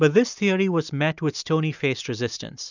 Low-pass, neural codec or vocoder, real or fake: 7.2 kHz; none; real